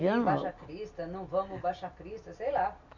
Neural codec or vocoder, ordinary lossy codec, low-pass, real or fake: none; none; 7.2 kHz; real